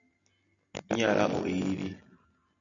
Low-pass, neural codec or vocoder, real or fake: 7.2 kHz; none; real